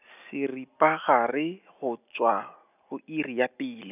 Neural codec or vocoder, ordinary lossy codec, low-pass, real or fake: none; none; 3.6 kHz; real